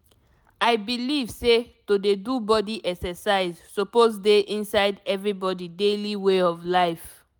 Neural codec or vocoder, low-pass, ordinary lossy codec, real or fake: none; none; none; real